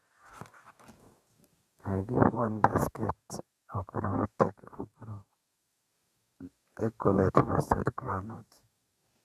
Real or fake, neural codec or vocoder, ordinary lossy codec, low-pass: fake; codec, 44.1 kHz, 2.6 kbps, DAC; Opus, 64 kbps; 14.4 kHz